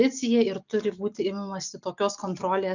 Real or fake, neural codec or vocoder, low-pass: real; none; 7.2 kHz